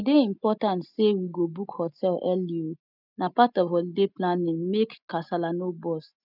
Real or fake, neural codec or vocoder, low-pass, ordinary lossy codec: real; none; 5.4 kHz; none